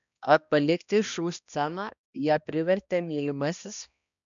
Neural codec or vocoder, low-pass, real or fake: codec, 16 kHz, 1 kbps, X-Codec, HuBERT features, trained on balanced general audio; 7.2 kHz; fake